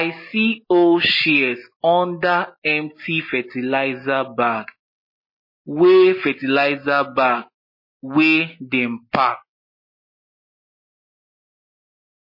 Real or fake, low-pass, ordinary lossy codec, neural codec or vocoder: real; 5.4 kHz; MP3, 24 kbps; none